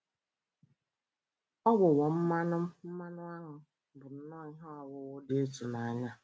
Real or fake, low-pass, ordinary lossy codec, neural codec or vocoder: real; none; none; none